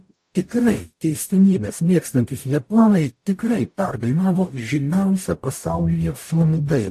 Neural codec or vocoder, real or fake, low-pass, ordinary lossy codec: codec, 44.1 kHz, 0.9 kbps, DAC; fake; 14.4 kHz; AAC, 64 kbps